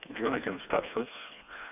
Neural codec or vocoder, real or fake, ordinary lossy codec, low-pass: codec, 16 kHz, 2 kbps, FreqCodec, smaller model; fake; none; 3.6 kHz